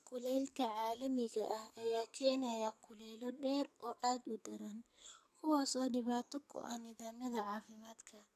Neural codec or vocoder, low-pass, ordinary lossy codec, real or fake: codec, 44.1 kHz, 2.6 kbps, SNAC; 14.4 kHz; none; fake